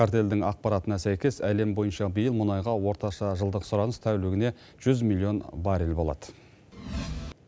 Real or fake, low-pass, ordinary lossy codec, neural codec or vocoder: real; none; none; none